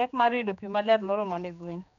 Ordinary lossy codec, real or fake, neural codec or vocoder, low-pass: none; fake; codec, 16 kHz, 2 kbps, X-Codec, HuBERT features, trained on general audio; 7.2 kHz